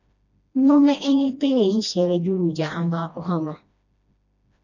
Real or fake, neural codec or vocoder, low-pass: fake; codec, 16 kHz, 1 kbps, FreqCodec, smaller model; 7.2 kHz